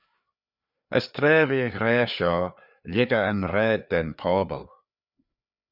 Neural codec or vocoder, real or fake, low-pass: codec, 16 kHz, 4 kbps, FreqCodec, larger model; fake; 5.4 kHz